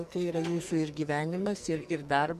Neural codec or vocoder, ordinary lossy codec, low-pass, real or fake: codec, 44.1 kHz, 2.6 kbps, SNAC; MP3, 64 kbps; 14.4 kHz; fake